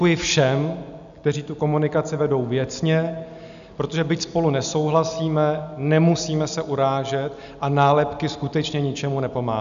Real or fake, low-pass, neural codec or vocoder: real; 7.2 kHz; none